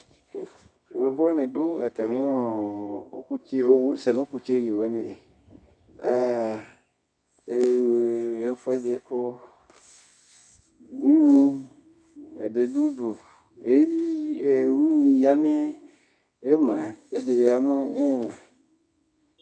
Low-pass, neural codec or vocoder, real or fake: 9.9 kHz; codec, 24 kHz, 0.9 kbps, WavTokenizer, medium music audio release; fake